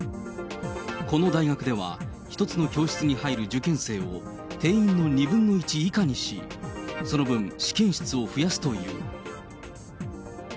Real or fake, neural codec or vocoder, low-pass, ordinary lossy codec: real; none; none; none